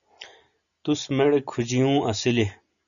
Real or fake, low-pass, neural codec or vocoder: real; 7.2 kHz; none